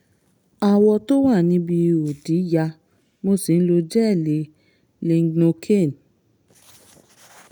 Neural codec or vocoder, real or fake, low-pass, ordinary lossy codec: none; real; none; none